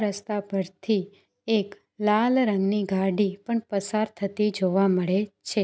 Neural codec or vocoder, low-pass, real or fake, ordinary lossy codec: none; none; real; none